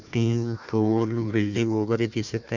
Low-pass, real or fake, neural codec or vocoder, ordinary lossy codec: 7.2 kHz; fake; codec, 16 kHz, 1 kbps, FunCodec, trained on Chinese and English, 50 frames a second; Opus, 64 kbps